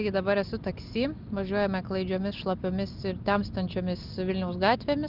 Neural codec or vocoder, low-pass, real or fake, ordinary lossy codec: none; 5.4 kHz; real; Opus, 32 kbps